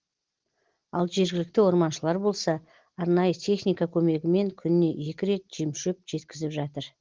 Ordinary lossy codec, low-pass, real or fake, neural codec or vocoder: Opus, 16 kbps; 7.2 kHz; real; none